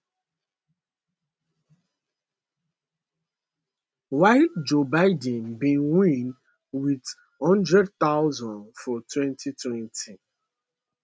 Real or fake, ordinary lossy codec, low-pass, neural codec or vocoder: real; none; none; none